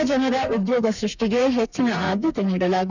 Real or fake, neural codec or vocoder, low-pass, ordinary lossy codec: fake; codec, 32 kHz, 1.9 kbps, SNAC; 7.2 kHz; none